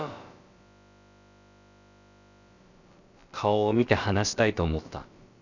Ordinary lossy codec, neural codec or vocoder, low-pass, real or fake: none; codec, 16 kHz, about 1 kbps, DyCAST, with the encoder's durations; 7.2 kHz; fake